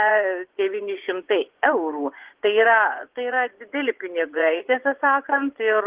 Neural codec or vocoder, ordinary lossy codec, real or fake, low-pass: vocoder, 24 kHz, 100 mel bands, Vocos; Opus, 16 kbps; fake; 3.6 kHz